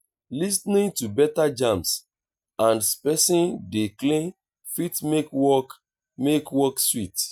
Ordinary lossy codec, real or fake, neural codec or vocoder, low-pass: none; real; none; none